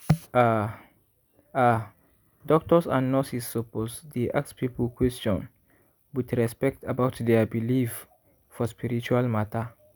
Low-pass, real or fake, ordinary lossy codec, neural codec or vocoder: none; real; none; none